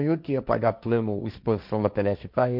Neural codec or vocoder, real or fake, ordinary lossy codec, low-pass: codec, 16 kHz, 1.1 kbps, Voila-Tokenizer; fake; none; 5.4 kHz